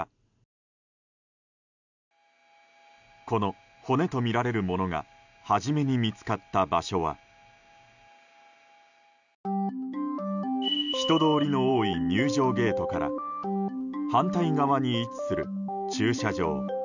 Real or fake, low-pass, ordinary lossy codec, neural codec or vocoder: real; 7.2 kHz; none; none